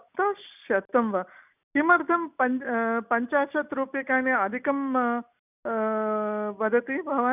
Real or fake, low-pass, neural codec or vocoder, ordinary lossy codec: real; 3.6 kHz; none; none